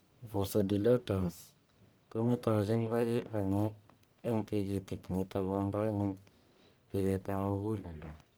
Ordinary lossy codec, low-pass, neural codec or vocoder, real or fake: none; none; codec, 44.1 kHz, 1.7 kbps, Pupu-Codec; fake